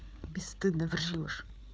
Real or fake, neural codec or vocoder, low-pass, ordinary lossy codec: fake; codec, 16 kHz, 8 kbps, FreqCodec, larger model; none; none